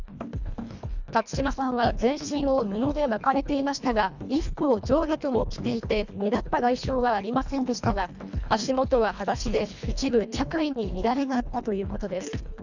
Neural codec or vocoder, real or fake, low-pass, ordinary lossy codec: codec, 24 kHz, 1.5 kbps, HILCodec; fake; 7.2 kHz; none